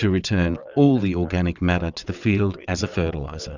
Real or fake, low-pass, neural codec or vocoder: fake; 7.2 kHz; vocoder, 22.05 kHz, 80 mel bands, WaveNeXt